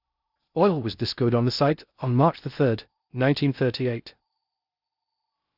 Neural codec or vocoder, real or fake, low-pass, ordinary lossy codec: codec, 16 kHz in and 24 kHz out, 0.6 kbps, FocalCodec, streaming, 2048 codes; fake; 5.4 kHz; Opus, 64 kbps